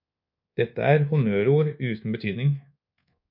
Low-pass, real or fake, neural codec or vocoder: 5.4 kHz; fake; codec, 24 kHz, 1.2 kbps, DualCodec